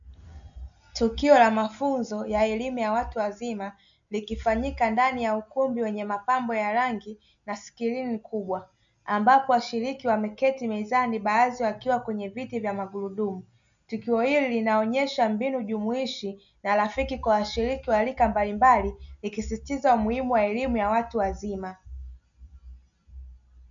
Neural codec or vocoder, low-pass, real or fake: none; 7.2 kHz; real